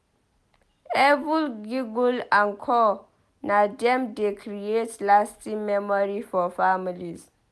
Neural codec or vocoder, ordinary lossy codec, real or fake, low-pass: none; none; real; none